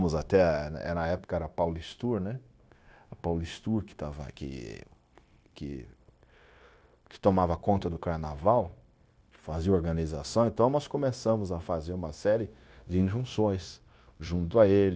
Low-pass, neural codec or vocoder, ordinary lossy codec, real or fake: none; codec, 16 kHz, 0.9 kbps, LongCat-Audio-Codec; none; fake